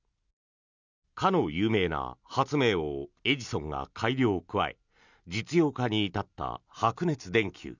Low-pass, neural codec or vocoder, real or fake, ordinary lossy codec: 7.2 kHz; none; real; none